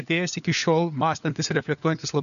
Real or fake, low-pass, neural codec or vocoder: fake; 7.2 kHz; codec, 16 kHz, 4 kbps, FunCodec, trained on LibriTTS, 50 frames a second